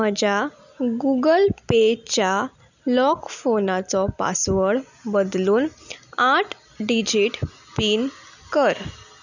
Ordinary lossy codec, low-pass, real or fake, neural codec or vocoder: none; 7.2 kHz; real; none